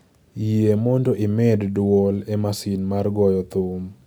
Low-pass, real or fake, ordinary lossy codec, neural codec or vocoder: none; real; none; none